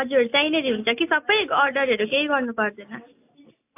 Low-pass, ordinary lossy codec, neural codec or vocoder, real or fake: 3.6 kHz; AAC, 24 kbps; none; real